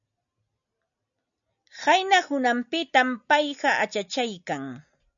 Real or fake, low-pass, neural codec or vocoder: real; 7.2 kHz; none